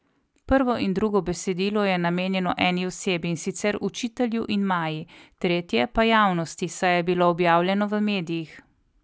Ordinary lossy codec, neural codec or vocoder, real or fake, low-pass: none; none; real; none